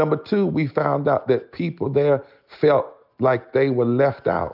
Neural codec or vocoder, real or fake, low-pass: none; real; 5.4 kHz